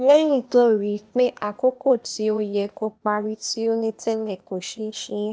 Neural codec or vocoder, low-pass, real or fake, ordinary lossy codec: codec, 16 kHz, 0.8 kbps, ZipCodec; none; fake; none